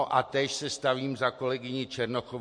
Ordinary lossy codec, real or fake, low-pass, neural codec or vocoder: MP3, 48 kbps; real; 9.9 kHz; none